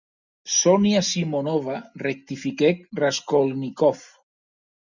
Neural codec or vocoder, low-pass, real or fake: none; 7.2 kHz; real